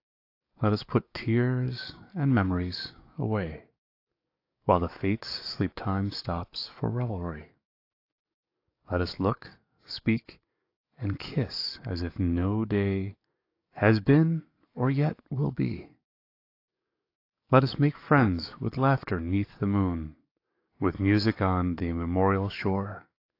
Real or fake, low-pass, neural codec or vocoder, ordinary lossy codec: fake; 5.4 kHz; codec, 44.1 kHz, 7.8 kbps, DAC; AAC, 32 kbps